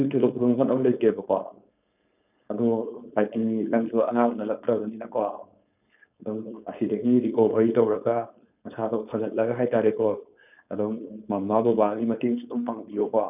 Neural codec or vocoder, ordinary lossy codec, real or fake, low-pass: codec, 16 kHz, 4.8 kbps, FACodec; none; fake; 3.6 kHz